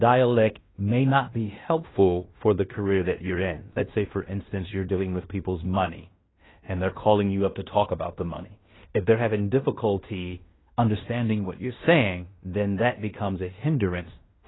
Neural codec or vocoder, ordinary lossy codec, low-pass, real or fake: codec, 16 kHz in and 24 kHz out, 0.9 kbps, LongCat-Audio-Codec, fine tuned four codebook decoder; AAC, 16 kbps; 7.2 kHz; fake